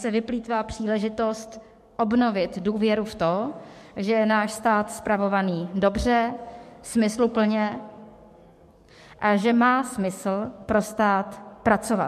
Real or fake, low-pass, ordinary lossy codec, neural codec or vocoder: fake; 14.4 kHz; MP3, 64 kbps; codec, 44.1 kHz, 7.8 kbps, DAC